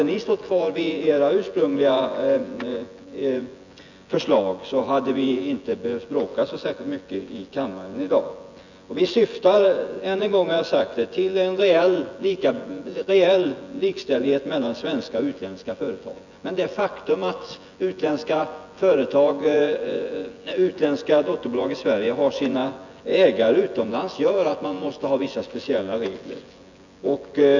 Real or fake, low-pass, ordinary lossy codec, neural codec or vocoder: fake; 7.2 kHz; none; vocoder, 24 kHz, 100 mel bands, Vocos